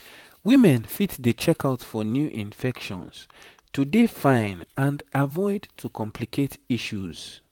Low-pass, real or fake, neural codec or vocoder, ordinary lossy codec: 19.8 kHz; fake; vocoder, 44.1 kHz, 128 mel bands, Pupu-Vocoder; none